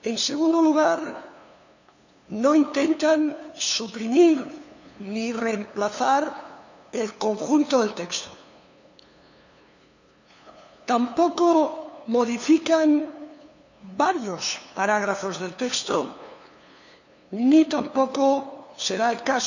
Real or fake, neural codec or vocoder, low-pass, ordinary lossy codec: fake; codec, 16 kHz, 2 kbps, FunCodec, trained on LibriTTS, 25 frames a second; 7.2 kHz; none